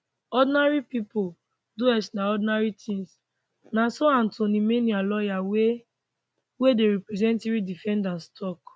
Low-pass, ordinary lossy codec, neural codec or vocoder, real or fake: none; none; none; real